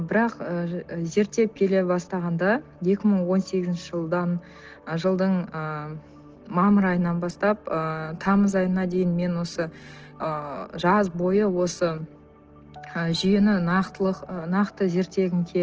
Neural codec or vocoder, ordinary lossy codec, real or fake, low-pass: none; Opus, 16 kbps; real; 7.2 kHz